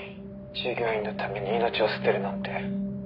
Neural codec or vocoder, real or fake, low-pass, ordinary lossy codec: none; real; 5.4 kHz; none